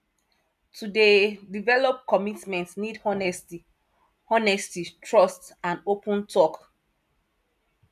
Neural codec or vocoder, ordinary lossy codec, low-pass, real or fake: none; none; 14.4 kHz; real